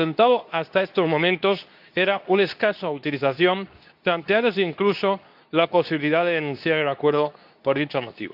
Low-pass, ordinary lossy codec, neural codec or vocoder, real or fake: 5.4 kHz; AAC, 48 kbps; codec, 24 kHz, 0.9 kbps, WavTokenizer, medium speech release version 2; fake